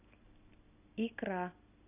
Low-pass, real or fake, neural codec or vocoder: 3.6 kHz; real; none